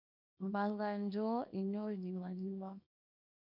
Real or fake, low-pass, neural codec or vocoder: fake; 5.4 kHz; codec, 24 kHz, 0.9 kbps, WavTokenizer, small release